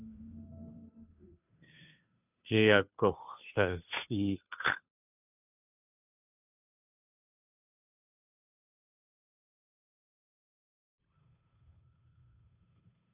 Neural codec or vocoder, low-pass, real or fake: codec, 16 kHz, 2 kbps, FunCodec, trained on Chinese and English, 25 frames a second; 3.6 kHz; fake